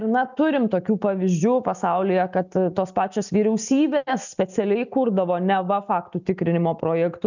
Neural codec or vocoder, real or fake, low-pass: none; real; 7.2 kHz